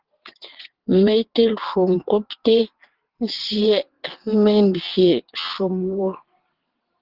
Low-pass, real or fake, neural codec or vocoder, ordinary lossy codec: 5.4 kHz; fake; vocoder, 22.05 kHz, 80 mel bands, WaveNeXt; Opus, 16 kbps